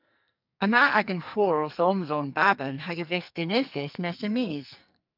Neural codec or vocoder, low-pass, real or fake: codec, 44.1 kHz, 2.6 kbps, SNAC; 5.4 kHz; fake